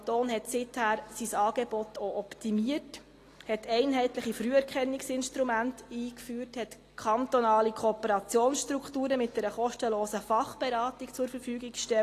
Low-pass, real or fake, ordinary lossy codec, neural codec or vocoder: 14.4 kHz; real; AAC, 48 kbps; none